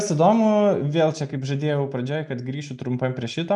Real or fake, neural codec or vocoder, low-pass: real; none; 10.8 kHz